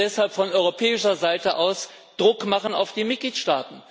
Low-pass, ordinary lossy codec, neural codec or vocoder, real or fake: none; none; none; real